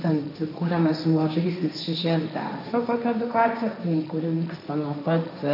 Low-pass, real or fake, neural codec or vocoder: 5.4 kHz; fake; codec, 16 kHz, 1.1 kbps, Voila-Tokenizer